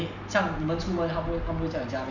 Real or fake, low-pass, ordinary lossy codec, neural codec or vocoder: real; 7.2 kHz; none; none